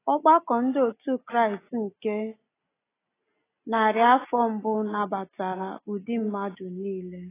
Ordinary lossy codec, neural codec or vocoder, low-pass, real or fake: AAC, 16 kbps; none; 3.6 kHz; real